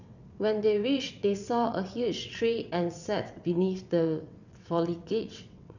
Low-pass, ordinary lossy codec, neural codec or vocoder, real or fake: 7.2 kHz; none; vocoder, 22.05 kHz, 80 mel bands, WaveNeXt; fake